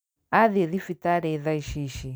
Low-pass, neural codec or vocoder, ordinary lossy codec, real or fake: none; none; none; real